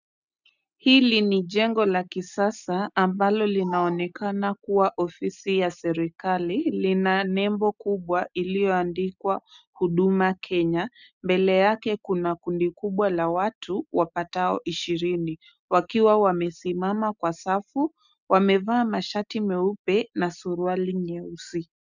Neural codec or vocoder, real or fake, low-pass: none; real; 7.2 kHz